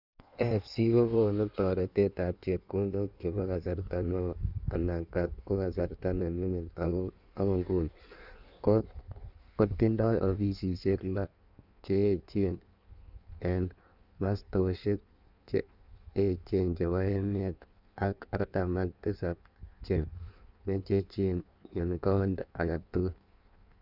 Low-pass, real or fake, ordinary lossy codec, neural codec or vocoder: 5.4 kHz; fake; none; codec, 16 kHz in and 24 kHz out, 1.1 kbps, FireRedTTS-2 codec